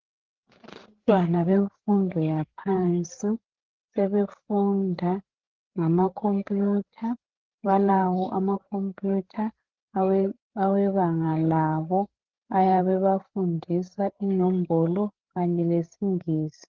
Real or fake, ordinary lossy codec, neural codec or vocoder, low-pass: fake; Opus, 16 kbps; codec, 16 kHz, 8 kbps, FreqCodec, larger model; 7.2 kHz